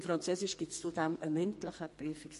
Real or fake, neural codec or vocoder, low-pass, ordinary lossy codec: fake; codec, 44.1 kHz, 2.6 kbps, SNAC; 14.4 kHz; MP3, 48 kbps